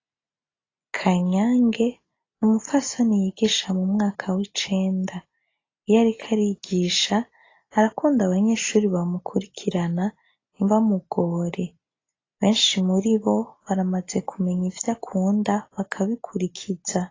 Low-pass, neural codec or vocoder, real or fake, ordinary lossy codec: 7.2 kHz; none; real; AAC, 32 kbps